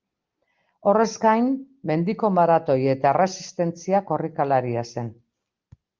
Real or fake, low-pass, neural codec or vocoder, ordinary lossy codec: real; 7.2 kHz; none; Opus, 32 kbps